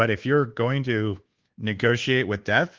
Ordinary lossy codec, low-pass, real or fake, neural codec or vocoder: Opus, 16 kbps; 7.2 kHz; fake; codec, 24 kHz, 1.2 kbps, DualCodec